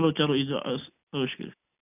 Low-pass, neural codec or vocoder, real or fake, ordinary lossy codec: 3.6 kHz; none; real; none